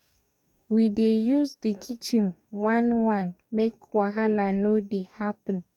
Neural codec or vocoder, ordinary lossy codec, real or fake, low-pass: codec, 44.1 kHz, 2.6 kbps, DAC; none; fake; 19.8 kHz